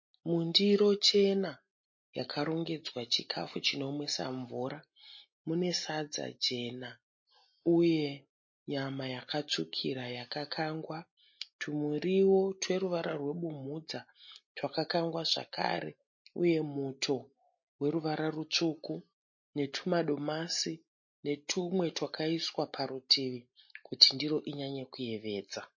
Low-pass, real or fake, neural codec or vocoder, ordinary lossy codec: 7.2 kHz; real; none; MP3, 32 kbps